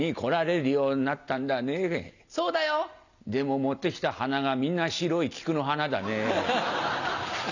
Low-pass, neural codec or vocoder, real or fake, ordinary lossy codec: 7.2 kHz; none; real; none